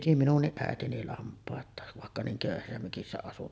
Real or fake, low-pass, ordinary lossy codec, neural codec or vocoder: real; none; none; none